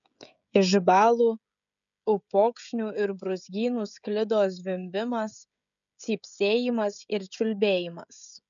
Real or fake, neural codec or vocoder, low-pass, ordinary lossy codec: fake; codec, 16 kHz, 16 kbps, FreqCodec, smaller model; 7.2 kHz; MP3, 96 kbps